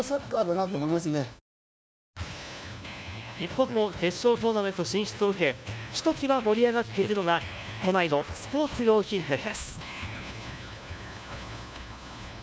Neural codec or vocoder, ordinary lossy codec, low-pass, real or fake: codec, 16 kHz, 1 kbps, FunCodec, trained on LibriTTS, 50 frames a second; none; none; fake